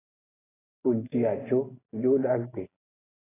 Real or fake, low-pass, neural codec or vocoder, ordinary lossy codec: real; 3.6 kHz; none; AAC, 16 kbps